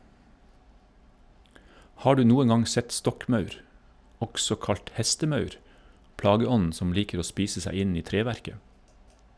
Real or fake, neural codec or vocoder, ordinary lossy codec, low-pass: real; none; none; none